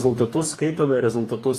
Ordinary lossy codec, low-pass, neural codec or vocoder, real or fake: AAC, 48 kbps; 14.4 kHz; codec, 44.1 kHz, 2.6 kbps, DAC; fake